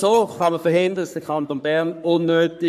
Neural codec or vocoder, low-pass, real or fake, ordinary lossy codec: codec, 44.1 kHz, 3.4 kbps, Pupu-Codec; 14.4 kHz; fake; none